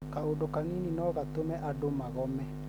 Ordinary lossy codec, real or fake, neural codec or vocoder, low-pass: none; real; none; none